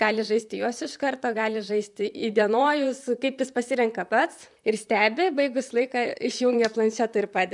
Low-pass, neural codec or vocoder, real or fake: 10.8 kHz; vocoder, 44.1 kHz, 128 mel bands, Pupu-Vocoder; fake